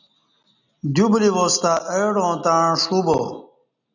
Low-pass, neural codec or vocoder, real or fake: 7.2 kHz; none; real